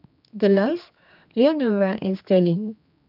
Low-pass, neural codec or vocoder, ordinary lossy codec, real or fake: 5.4 kHz; codec, 16 kHz, 2 kbps, X-Codec, HuBERT features, trained on general audio; none; fake